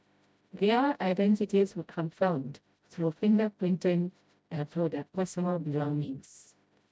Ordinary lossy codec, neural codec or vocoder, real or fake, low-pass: none; codec, 16 kHz, 0.5 kbps, FreqCodec, smaller model; fake; none